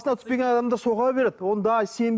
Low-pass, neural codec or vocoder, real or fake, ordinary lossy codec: none; none; real; none